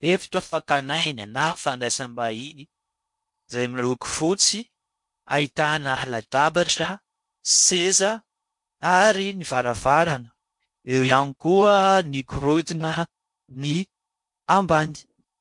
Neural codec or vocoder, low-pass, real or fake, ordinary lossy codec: codec, 16 kHz in and 24 kHz out, 0.6 kbps, FocalCodec, streaming, 4096 codes; 10.8 kHz; fake; MP3, 64 kbps